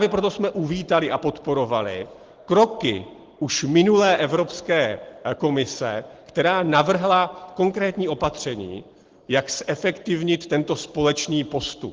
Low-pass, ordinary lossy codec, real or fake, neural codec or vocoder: 7.2 kHz; Opus, 16 kbps; real; none